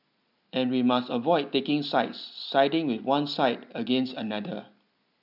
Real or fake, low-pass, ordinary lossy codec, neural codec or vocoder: real; 5.4 kHz; none; none